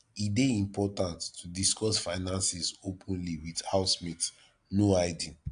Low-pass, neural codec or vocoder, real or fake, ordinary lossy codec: 9.9 kHz; none; real; AAC, 64 kbps